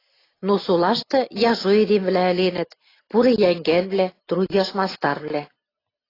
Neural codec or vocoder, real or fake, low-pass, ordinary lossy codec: none; real; 5.4 kHz; AAC, 24 kbps